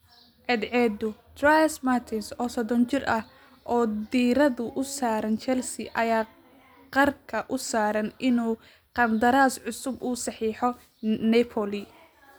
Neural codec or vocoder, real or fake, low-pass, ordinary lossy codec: none; real; none; none